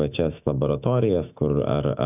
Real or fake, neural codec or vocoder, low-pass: fake; autoencoder, 48 kHz, 128 numbers a frame, DAC-VAE, trained on Japanese speech; 3.6 kHz